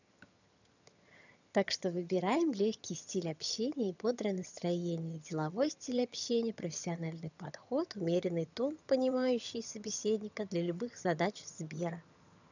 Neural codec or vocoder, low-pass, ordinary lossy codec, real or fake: vocoder, 22.05 kHz, 80 mel bands, HiFi-GAN; 7.2 kHz; none; fake